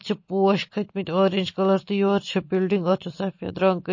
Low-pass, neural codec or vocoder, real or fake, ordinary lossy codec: 7.2 kHz; none; real; MP3, 32 kbps